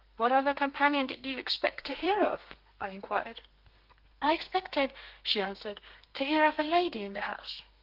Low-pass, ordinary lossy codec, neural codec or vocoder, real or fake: 5.4 kHz; Opus, 24 kbps; codec, 32 kHz, 1.9 kbps, SNAC; fake